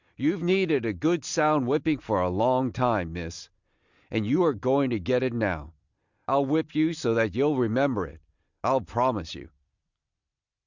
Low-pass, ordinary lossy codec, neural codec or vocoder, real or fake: 7.2 kHz; Opus, 64 kbps; none; real